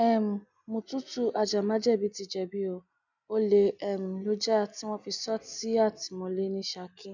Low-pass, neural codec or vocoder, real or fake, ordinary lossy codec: 7.2 kHz; none; real; none